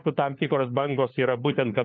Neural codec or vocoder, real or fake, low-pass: codec, 16 kHz, 4 kbps, FunCodec, trained on LibriTTS, 50 frames a second; fake; 7.2 kHz